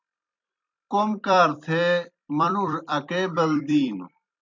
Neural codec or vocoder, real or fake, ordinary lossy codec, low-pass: vocoder, 44.1 kHz, 128 mel bands every 256 samples, BigVGAN v2; fake; MP3, 64 kbps; 7.2 kHz